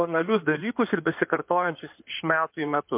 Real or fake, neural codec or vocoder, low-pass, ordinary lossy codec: fake; codec, 16 kHz in and 24 kHz out, 2.2 kbps, FireRedTTS-2 codec; 3.6 kHz; MP3, 32 kbps